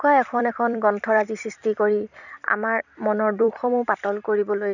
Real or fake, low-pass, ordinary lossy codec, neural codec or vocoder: fake; 7.2 kHz; none; vocoder, 44.1 kHz, 128 mel bands every 256 samples, BigVGAN v2